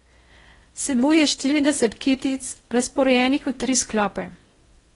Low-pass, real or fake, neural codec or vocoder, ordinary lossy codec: 10.8 kHz; fake; codec, 16 kHz in and 24 kHz out, 0.6 kbps, FocalCodec, streaming, 4096 codes; AAC, 32 kbps